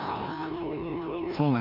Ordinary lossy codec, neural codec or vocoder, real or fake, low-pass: none; codec, 16 kHz, 1 kbps, FreqCodec, larger model; fake; 5.4 kHz